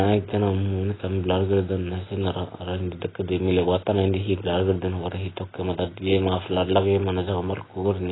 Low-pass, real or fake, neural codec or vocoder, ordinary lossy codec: 7.2 kHz; real; none; AAC, 16 kbps